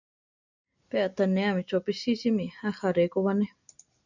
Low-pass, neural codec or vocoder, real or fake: 7.2 kHz; none; real